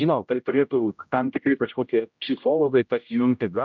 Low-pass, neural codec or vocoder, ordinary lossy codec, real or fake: 7.2 kHz; codec, 16 kHz, 0.5 kbps, X-Codec, HuBERT features, trained on general audio; MP3, 64 kbps; fake